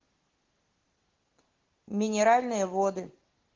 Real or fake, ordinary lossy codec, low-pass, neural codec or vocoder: real; Opus, 16 kbps; 7.2 kHz; none